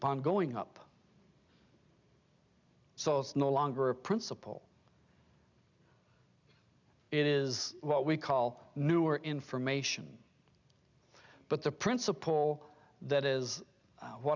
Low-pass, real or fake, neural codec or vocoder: 7.2 kHz; real; none